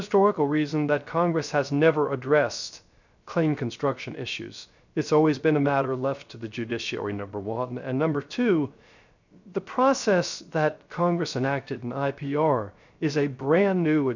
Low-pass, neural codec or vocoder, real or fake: 7.2 kHz; codec, 16 kHz, 0.3 kbps, FocalCodec; fake